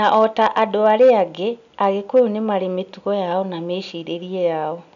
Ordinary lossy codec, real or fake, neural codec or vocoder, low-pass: none; real; none; 7.2 kHz